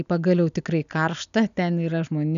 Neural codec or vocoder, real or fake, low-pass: none; real; 7.2 kHz